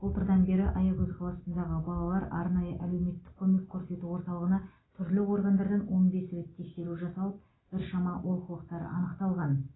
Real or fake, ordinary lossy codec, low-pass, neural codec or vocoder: real; AAC, 16 kbps; 7.2 kHz; none